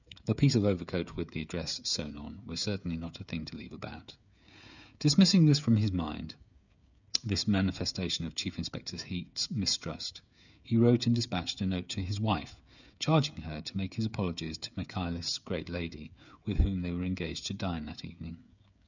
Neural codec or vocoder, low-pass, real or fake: codec, 16 kHz, 16 kbps, FreqCodec, smaller model; 7.2 kHz; fake